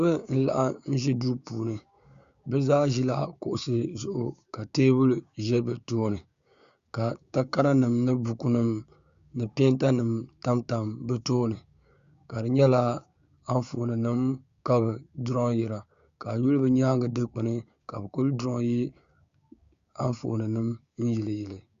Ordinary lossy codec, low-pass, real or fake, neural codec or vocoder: Opus, 64 kbps; 7.2 kHz; fake; codec, 16 kHz, 6 kbps, DAC